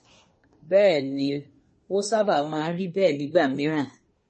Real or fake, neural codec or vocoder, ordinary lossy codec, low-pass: fake; codec, 24 kHz, 1 kbps, SNAC; MP3, 32 kbps; 10.8 kHz